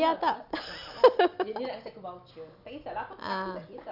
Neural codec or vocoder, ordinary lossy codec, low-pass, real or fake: none; none; 5.4 kHz; real